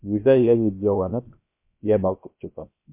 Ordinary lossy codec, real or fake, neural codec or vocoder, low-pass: MP3, 24 kbps; fake; codec, 16 kHz, 0.3 kbps, FocalCodec; 3.6 kHz